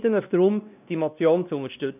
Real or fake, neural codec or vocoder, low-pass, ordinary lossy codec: fake; codec, 16 kHz, 1 kbps, X-Codec, WavLM features, trained on Multilingual LibriSpeech; 3.6 kHz; none